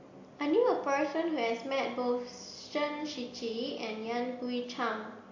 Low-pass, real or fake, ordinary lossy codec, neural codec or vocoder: 7.2 kHz; real; AAC, 48 kbps; none